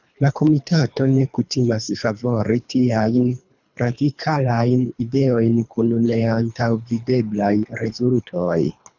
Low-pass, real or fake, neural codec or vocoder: 7.2 kHz; fake; codec, 24 kHz, 3 kbps, HILCodec